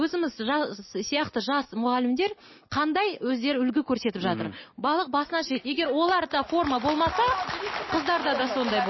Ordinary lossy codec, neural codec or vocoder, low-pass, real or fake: MP3, 24 kbps; none; 7.2 kHz; real